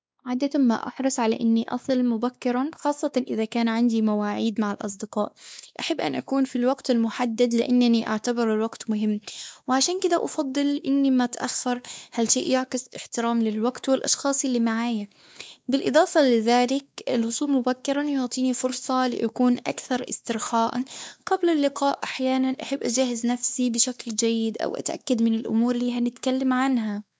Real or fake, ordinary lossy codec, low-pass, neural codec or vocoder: fake; none; none; codec, 16 kHz, 2 kbps, X-Codec, WavLM features, trained on Multilingual LibriSpeech